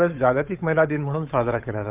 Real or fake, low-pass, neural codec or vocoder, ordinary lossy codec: fake; 3.6 kHz; codec, 16 kHz, 8 kbps, FunCodec, trained on Chinese and English, 25 frames a second; Opus, 24 kbps